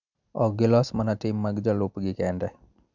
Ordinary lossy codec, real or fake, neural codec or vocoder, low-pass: none; real; none; 7.2 kHz